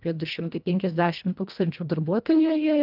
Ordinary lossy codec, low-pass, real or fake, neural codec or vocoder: Opus, 24 kbps; 5.4 kHz; fake; codec, 24 kHz, 1.5 kbps, HILCodec